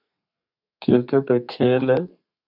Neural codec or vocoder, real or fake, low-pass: codec, 32 kHz, 1.9 kbps, SNAC; fake; 5.4 kHz